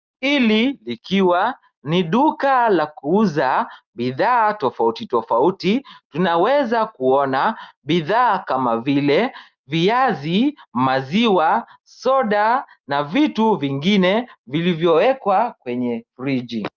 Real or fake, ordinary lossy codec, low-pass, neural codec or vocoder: real; Opus, 24 kbps; 7.2 kHz; none